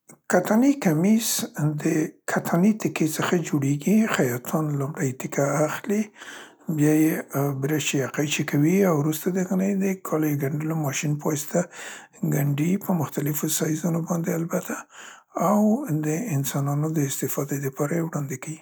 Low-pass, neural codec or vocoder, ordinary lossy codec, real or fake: none; none; none; real